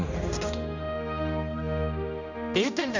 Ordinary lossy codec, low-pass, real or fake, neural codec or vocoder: none; 7.2 kHz; fake; codec, 16 kHz, 1 kbps, X-Codec, HuBERT features, trained on general audio